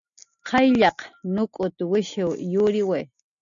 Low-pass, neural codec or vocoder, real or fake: 7.2 kHz; none; real